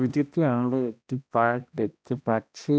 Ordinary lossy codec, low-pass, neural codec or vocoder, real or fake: none; none; codec, 16 kHz, 1 kbps, X-Codec, HuBERT features, trained on balanced general audio; fake